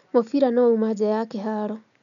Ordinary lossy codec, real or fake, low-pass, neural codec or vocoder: none; real; 7.2 kHz; none